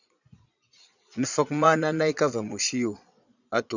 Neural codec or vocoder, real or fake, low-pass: vocoder, 22.05 kHz, 80 mel bands, Vocos; fake; 7.2 kHz